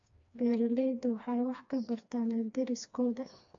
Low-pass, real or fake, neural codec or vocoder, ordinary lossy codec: 7.2 kHz; fake; codec, 16 kHz, 2 kbps, FreqCodec, smaller model; AAC, 64 kbps